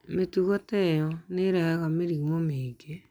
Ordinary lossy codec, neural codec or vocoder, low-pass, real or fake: MP3, 96 kbps; none; 19.8 kHz; real